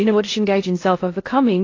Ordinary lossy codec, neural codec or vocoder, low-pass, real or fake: AAC, 32 kbps; codec, 16 kHz in and 24 kHz out, 0.6 kbps, FocalCodec, streaming, 2048 codes; 7.2 kHz; fake